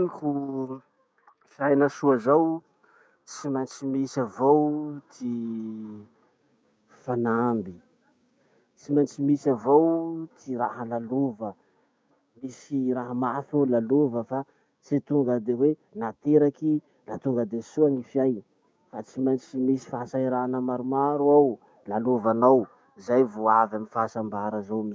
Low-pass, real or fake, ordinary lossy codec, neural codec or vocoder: none; fake; none; codec, 16 kHz, 6 kbps, DAC